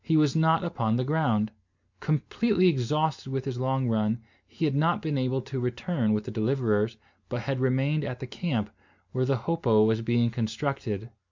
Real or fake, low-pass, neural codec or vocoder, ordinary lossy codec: real; 7.2 kHz; none; MP3, 64 kbps